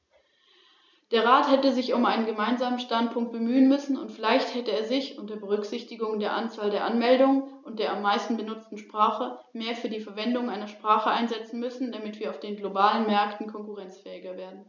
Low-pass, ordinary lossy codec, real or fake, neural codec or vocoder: 7.2 kHz; none; real; none